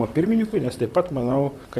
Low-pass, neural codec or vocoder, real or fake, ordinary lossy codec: 14.4 kHz; vocoder, 44.1 kHz, 128 mel bands, Pupu-Vocoder; fake; Opus, 64 kbps